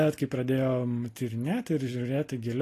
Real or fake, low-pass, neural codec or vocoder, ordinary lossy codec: real; 14.4 kHz; none; AAC, 48 kbps